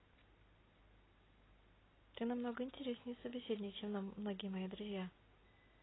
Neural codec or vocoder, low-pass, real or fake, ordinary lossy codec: none; 7.2 kHz; real; AAC, 16 kbps